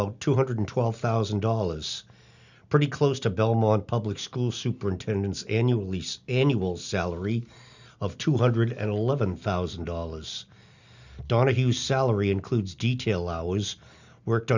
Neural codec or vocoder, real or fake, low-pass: none; real; 7.2 kHz